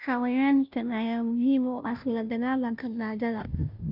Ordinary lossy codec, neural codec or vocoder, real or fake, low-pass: none; codec, 16 kHz, 0.5 kbps, FunCodec, trained on Chinese and English, 25 frames a second; fake; 5.4 kHz